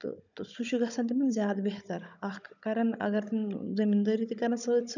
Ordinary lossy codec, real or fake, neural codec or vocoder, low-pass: none; fake; codec, 16 kHz, 16 kbps, FunCodec, trained on Chinese and English, 50 frames a second; 7.2 kHz